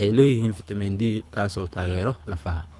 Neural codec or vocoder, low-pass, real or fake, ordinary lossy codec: codec, 24 kHz, 3 kbps, HILCodec; none; fake; none